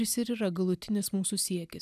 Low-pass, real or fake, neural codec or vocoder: 14.4 kHz; real; none